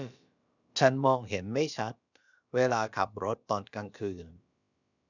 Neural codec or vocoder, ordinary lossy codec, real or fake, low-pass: codec, 16 kHz, about 1 kbps, DyCAST, with the encoder's durations; none; fake; 7.2 kHz